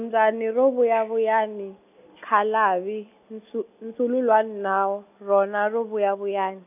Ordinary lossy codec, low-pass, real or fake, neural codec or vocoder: none; 3.6 kHz; real; none